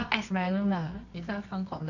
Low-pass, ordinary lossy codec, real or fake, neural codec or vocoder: 7.2 kHz; none; fake; codec, 24 kHz, 0.9 kbps, WavTokenizer, medium music audio release